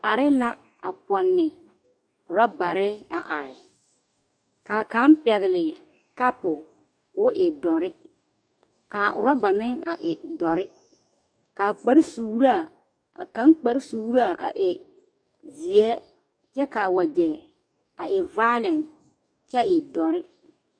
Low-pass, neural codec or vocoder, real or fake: 9.9 kHz; codec, 44.1 kHz, 2.6 kbps, DAC; fake